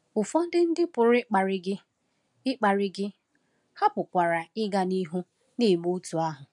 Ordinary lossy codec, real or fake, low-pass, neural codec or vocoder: none; real; 10.8 kHz; none